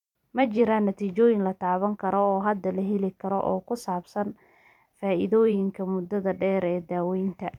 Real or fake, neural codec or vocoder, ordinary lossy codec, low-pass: fake; vocoder, 44.1 kHz, 128 mel bands every 512 samples, BigVGAN v2; none; 19.8 kHz